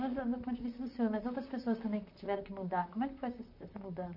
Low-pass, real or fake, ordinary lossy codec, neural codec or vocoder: 5.4 kHz; fake; none; vocoder, 44.1 kHz, 128 mel bands, Pupu-Vocoder